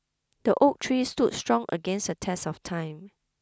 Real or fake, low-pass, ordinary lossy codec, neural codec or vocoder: real; none; none; none